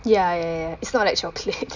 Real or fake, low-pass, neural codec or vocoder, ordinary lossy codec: real; 7.2 kHz; none; none